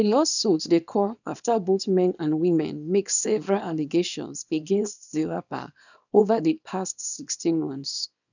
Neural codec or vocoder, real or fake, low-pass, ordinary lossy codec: codec, 24 kHz, 0.9 kbps, WavTokenizer, small release; fake; 7.2 kHz; none